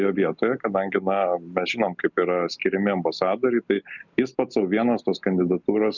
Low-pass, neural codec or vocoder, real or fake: 7.2 kHz; none; real